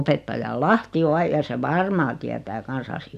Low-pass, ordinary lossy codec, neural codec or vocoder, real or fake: 14.4 kHz; none; none; real